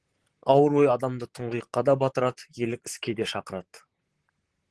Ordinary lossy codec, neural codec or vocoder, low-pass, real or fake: Opus, 16 kbps; vocoder, 44.1 kHz, 128 mel bands, Pupu-Vocoder; 10.8 kHz; fake